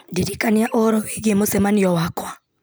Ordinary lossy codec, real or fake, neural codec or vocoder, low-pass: none; real; none; none